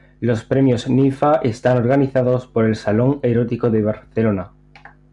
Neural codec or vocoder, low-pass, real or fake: none; 10.8 kHz; real